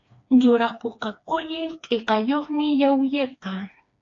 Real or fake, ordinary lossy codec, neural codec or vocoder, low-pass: fake; AAC, 48 kbps; codec, 16 kHz, 2 kbps, FreqCodec, smaller model; 7.2 kHz